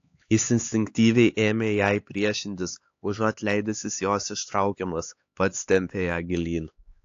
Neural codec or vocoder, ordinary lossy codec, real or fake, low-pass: codec, 16 kHz, 4 kbps, X-Codec, HuBERT features, trained on LibriSpeech; AAC, 48 kbps; fake; 7.2 kHz